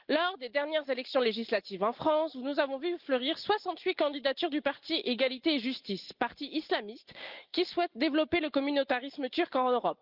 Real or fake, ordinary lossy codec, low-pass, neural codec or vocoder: real; Opus, 24 kbps; 5.4 kHz; none